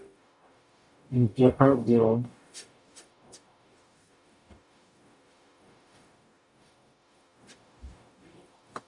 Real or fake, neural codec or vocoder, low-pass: fake; codec, 44.1 kHz, 0.9 kbps, DAC; 10.8 kHz